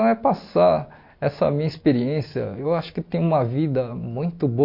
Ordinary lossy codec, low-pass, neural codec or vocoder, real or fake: MP3, 32 kbps; 5.4 kHz; none; real